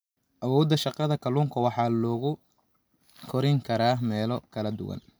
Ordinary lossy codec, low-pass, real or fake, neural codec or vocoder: none; none; real; none